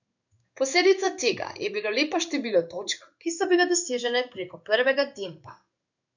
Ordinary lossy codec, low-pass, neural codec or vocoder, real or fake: none; 7.2 kHz; codec, 16 kHz in and 24 kHz out, 1 kbps, XY-Tokenizer; fake